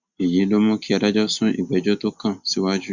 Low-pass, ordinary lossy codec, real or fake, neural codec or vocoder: 7.2 kHz; Opus, 64 kbps; real; none